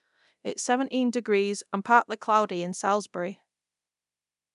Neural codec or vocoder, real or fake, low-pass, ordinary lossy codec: codec, 24 kHz, 0.9 kbps, DualCodec; fake; 10.8 kHz; none